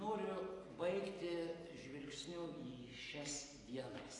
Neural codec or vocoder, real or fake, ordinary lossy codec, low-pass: none; real; AAC, 32 kbps; 10.8 kHz